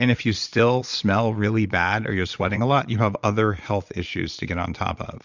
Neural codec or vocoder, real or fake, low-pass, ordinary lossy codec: vocoder, 22.05 kHz, 80 mel bands, Vocos; fake; 7.2 kHz; Opus, 64 kbps